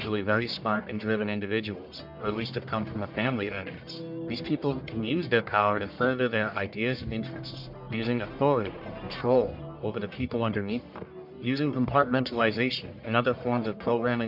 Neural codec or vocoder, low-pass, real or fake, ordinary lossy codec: codec, 44.1 kHz, 1.7 kbps, Pupu-Codec; 5.4 kHz; fake; MP3, 48 kbps